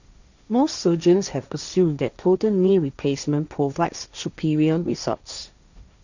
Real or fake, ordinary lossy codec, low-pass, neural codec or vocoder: fake; none; 7.2 kHz; codec, 16 kHz, 1.1 kbps, Voila-Tokenizer